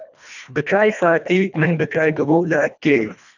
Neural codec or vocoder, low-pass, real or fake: codec, 24 kHz, 1.5 kbps, HILCodec; 7.2 kHz; fake